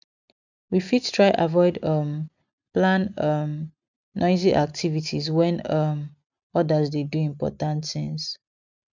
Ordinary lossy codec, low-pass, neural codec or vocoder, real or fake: none; 7.2 kHz; none; real